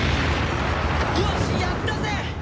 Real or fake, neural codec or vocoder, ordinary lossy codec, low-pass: real; none; none; none